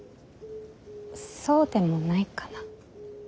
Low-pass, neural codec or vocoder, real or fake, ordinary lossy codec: none; none; real; none